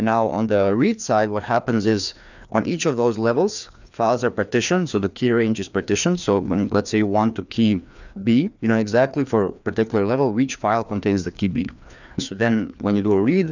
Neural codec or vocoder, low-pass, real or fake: codec, 16 kHz, 2 kbps, FreqCodec, larger model; 7.2 kHz; fake